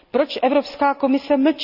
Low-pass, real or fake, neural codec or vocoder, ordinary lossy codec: 5.4 kHz; real; none; none